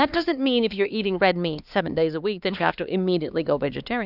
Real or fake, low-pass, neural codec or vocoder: fake; 5.4 kHz; codec, 16 kHz, 2 kbps, X-Codec, HuBERT features, trained on LibriSpeech